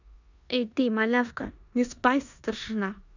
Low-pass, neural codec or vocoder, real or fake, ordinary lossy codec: 7.2 kHz; codec, 16 kHz in and 24 kHz out, 0.9 kbps, LongCat-Audio-Codec, four codebook decoder; fake; none